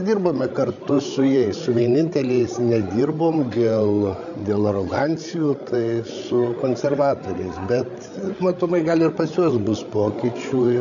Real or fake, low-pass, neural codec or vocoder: fake; 7.2 kHz; codec, 16 kHz, 16 kbps, FreqCodec, larger model